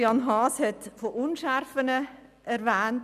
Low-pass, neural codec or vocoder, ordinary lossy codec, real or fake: 14.4 kHz; none; none; real